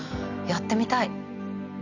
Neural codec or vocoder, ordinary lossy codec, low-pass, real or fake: none; none; 7.2 kHz; real